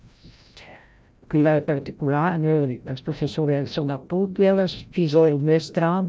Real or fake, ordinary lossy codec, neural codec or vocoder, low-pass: fake; none; codec, 16 kHz, 0.5 kbps, FreqCodec, larger model; none